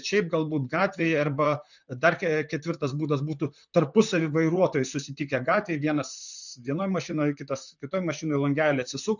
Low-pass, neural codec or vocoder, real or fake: 7.2 kHz; vocoder, 44.1 kHz, 128 mel bands, Pupu-Vocoder; fake